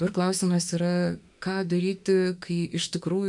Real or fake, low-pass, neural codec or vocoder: fake; 10.8 kHz; autoencoder, 48 kHz, 32 numbers a frame, DAC-VAE, trained on Japanese speech